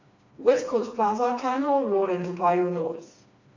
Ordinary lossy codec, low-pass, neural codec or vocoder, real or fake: AAC, 48 kbps; 7.2 kHz; codec, 16 kHz, 2 kbps, FreqCodec, smaller model; fake